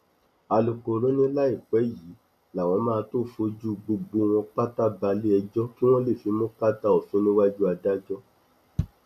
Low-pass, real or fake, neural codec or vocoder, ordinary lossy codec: 14.4 kHz; real; none; none